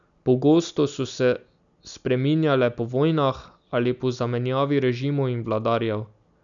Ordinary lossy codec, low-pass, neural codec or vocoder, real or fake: none; 7.2 kHz; none; real